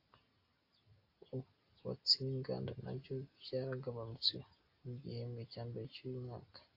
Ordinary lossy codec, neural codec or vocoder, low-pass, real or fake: AAC, 32 kbps; none; 5.4 kHz; real